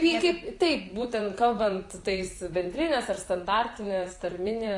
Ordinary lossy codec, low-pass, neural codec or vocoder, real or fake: AAC, 48 kbps; 10.8 kHz; vocoder, 44.1 kHz, 128 mel bands, Pupu-Vocoder; fake